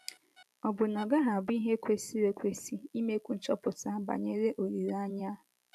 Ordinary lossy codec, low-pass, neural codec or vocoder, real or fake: none; 14.4 kHz; vocoder, 44.1 kHz, 128 mel bands every 512 samples, BigVGAN v2; fake